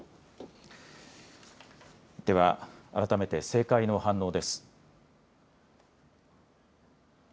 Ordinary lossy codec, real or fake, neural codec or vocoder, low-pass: none; real; none; none